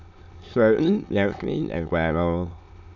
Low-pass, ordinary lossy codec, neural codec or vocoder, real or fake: 7.2 kHz; none; autoencoder, 22.05 kHz, a latent of 192 numbers a frame, VITS, trained on many speakers; fake